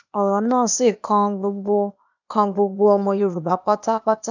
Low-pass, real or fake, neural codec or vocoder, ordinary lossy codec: 7.2 kHz; fake; codec, 16 kHz, 0.8 kbps, ZipCodec; none